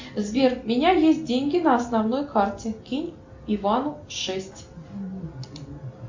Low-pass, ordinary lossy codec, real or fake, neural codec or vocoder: 7.2 kHz; MP3, 48 kbps; real; none